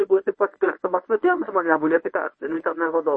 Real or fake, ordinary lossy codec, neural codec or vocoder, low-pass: fake; MP3, 32 kbps; codec, 24 kHz, 0.9 kbps, WavTokenizer, medium speech release version 1; 9.9 kHz